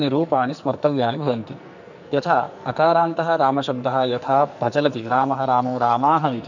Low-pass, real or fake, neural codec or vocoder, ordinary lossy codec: 7.2 kHz; fake; codec, 44.1 kHz, 2.6 kbps, SNAC; none